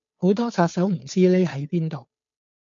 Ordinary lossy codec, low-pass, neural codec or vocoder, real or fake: MP3, 48 kbps; 7.2 kHz; codec, 16 kHz, 2 kbps, FunCodec, trained on Chinese and English, 25 frames a second; fake